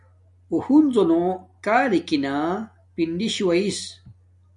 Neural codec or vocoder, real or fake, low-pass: none; real; 10.8 kHz